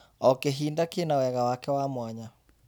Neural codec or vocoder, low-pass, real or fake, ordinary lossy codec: none; none; real; none